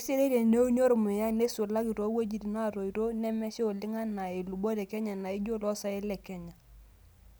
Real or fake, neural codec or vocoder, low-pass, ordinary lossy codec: real; none; none; none